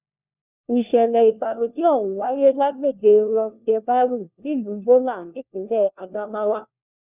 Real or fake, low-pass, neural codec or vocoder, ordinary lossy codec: fake; 3.6 kHz; codec, 16 kHz, 1 kbps, FunCodec, trained on LibriTTS, 50 frames a second; none